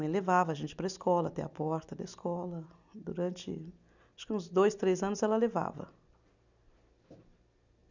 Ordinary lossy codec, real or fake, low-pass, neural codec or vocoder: none; real; 7.2 kHz; none